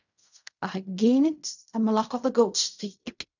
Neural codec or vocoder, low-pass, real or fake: codec, 16 kHz in and 24 kHz out, 0.4 kbps, LongCat-Audio-Codec, fine tuned four codebook decoder; 7.2 kHz; fake